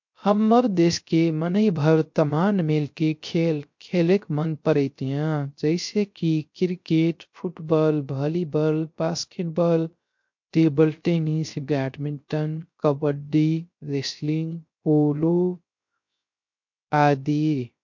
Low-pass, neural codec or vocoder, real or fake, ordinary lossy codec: 7.2 kHz; codec, 16 kHz, 0.3 kbps, FocalCodec; fake; MP3, 48 kbps